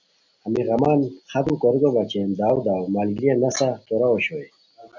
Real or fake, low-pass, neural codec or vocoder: real; 7.2 kHz; none